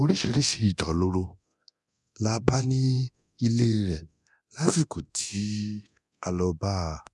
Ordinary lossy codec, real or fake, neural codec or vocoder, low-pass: none; fake; codec, 24 kHz, 0.9 kbps, DualCodec; none